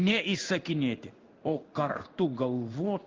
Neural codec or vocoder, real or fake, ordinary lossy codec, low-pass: codec, 16 kHz in and 24 kHz out, 1 kbps, XY-Tokenizer; fake; Opus, 24 kbps; 7.2 kHz